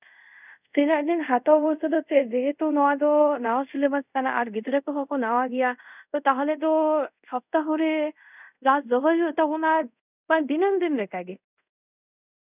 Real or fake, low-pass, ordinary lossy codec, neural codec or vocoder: fake; 3.6 kHz; none; codec, 24 kHz, 0.5 kbps, DualCodec